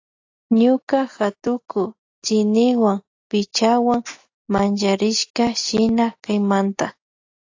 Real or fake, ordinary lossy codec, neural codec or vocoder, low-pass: real; AAC, 48 kbps; none; 7.2 kHz